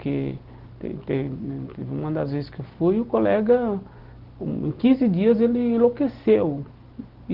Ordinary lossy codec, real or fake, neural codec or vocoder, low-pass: Opus, 16 kbps; real; none; 5.4 kHz